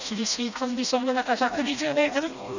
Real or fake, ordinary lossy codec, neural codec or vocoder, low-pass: fake; none; codec, 16 kHz, 1 kbps, FreqCodec, smaller model; 7.2 kHz